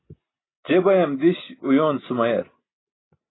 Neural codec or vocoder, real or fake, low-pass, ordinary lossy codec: none; real; 7.2 kHz; AAC, 16 kbps